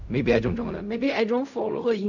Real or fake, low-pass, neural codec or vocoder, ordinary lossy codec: fake; 7.2 kHz; codec, 16 kHz in and 24 kHz out, 0.4 kbps, LongCat-Audio-Codec, fine tuned four codebook decoder; none